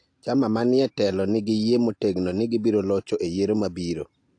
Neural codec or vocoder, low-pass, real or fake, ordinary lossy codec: none; 9.9 kHz; real; AAC, 48 kbps